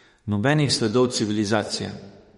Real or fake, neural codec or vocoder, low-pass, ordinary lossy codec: fake; autoencoder, 48 kHz, 32 numbers a frame, DAC-VAE, trained on Japanese speech; 19.8 kHz; MP3, 48 kbps